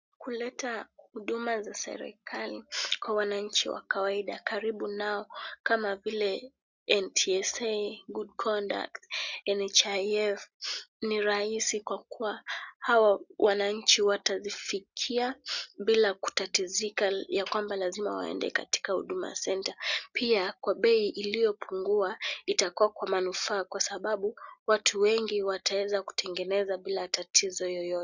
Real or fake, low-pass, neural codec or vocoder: real; 7.2 kHz; none